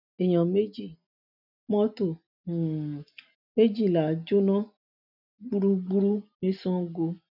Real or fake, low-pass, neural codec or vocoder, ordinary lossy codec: real; 5.4 kHz; none; none